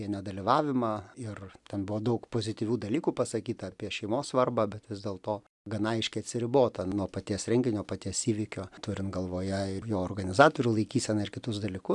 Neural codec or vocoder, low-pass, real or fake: none; 10.8 kHz; real